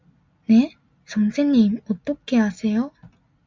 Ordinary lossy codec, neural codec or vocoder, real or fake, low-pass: MP3, 64 kbps; none; real; 7.2 kHz